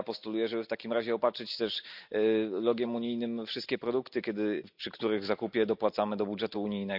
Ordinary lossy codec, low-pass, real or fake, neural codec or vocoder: none; 5.4 kHz; real; none